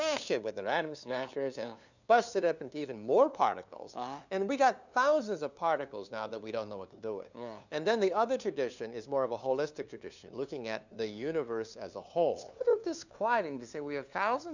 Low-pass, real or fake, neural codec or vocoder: 7.2 kHz; fake; codec, 16 kHz, 2 kbps, FunCodec, trained on LibriTTS, 25 frames a second